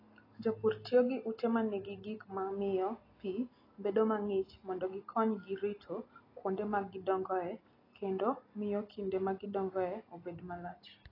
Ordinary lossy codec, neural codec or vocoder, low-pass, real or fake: AAC, 24 kbps; none; 5.4 kHz; real